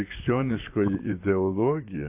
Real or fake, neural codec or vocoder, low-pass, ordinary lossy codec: fake; vocoder, 44.1 kHz, 128 mel bands, Pupu-Vocoder; 3.6 kHz; AAC, 32 kbps